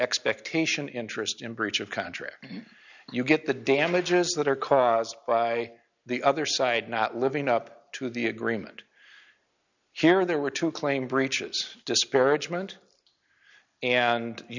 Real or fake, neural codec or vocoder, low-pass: real; none; 7.2 kHz